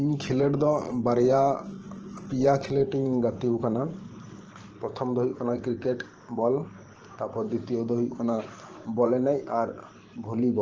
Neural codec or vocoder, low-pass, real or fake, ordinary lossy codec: vocoder, 44.1 kHz, 128 mel bands every 512 samples, BigVGAN v2; 7.2 kHz; fake; Opus, 16 kbps